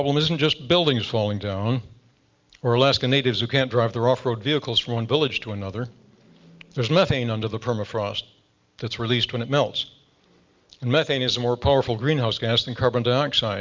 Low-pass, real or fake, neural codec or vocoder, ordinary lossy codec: 7.2 kHz; real; none; Opus, 24 kbps